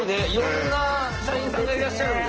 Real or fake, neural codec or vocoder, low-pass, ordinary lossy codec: real; none; 7.2 kHz; Opus, 16 kbps